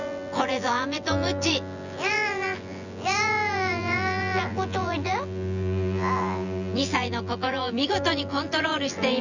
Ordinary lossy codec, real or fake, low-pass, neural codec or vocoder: none; fake; 7.2 kHz; vocoder, 24 kHz, 100 mel bands, Vocos